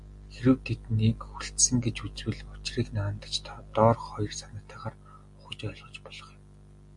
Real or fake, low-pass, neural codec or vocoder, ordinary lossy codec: real; 10.8 kHz; none; MP3, 64 kbps